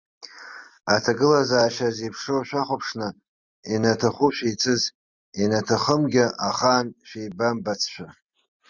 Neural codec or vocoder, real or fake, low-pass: none; real; 7.2 kHz